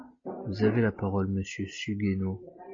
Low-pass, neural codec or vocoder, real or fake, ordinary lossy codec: 7.2 kHz; none; real; MP3, 32 kbps